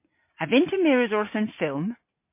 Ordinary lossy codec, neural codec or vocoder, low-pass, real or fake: MP3, 24 kbps; none; 3.6 kHz; real